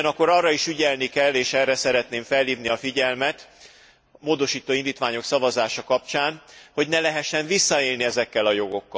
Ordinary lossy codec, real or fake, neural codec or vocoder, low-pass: none; real; none; none